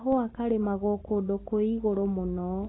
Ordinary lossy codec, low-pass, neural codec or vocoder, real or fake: AAC, 16 kbps; 7.2 kHz; none; real